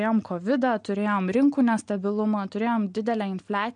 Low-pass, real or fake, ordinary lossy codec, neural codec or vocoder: 9.9 kHz; real; MP3, 64 kbps; none